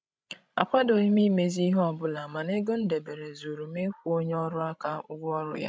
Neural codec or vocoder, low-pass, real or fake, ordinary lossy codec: codec, 16 kHz, 16 kbps, FreqCodec, larger model; none; fake; none